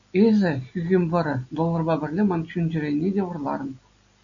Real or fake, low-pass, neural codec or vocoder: real; 7.2 kHz; none